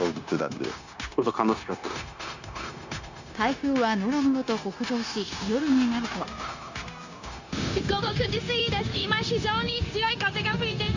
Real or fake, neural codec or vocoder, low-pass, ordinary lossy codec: fake; codec, 16 kHz, 0.9 kbps, LongCat-Audio-Codec; 7.2 kHz; Opus, 64 kbps